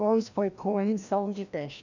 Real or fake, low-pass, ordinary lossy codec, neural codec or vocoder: fake; 7.2 kHz; none; codec, 16 kHz, 1 kbps, FreqCodec, larger model